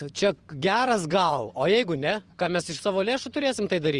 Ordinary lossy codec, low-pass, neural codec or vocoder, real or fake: Opus, 32 kbps; 10.8 kHz; vocoder, 24 kHz, 100 mel bands, Vocos; fake